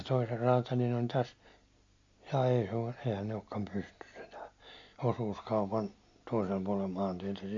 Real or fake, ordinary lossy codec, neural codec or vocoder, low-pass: real; MP3, 48 kbps; none; 7.2 kHz